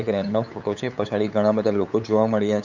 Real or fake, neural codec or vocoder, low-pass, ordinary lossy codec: fake; codec, 16 kHz, 8 kbps, FunCodec, trained on LibriTTS, 25 frames a second; 7.2 kHz; none